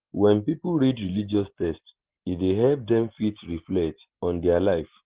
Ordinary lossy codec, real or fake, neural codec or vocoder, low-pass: Opus, 16 kbps; real; none; 3.6 kHz